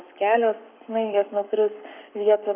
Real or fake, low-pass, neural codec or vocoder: fake; 3.6 kHz; codec, 44.1 kHz, 7.8 kbps, Pupu-Codec